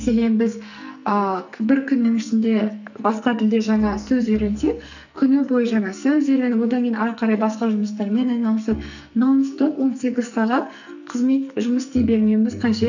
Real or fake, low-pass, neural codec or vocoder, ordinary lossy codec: fake; 7.2 kHz; codec, 44.1 kHz, 2.6 kbps, SNAC; none